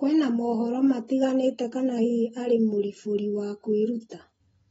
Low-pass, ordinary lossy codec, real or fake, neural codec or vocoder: 14.4 kHz; AAC, 24 kbps; real; none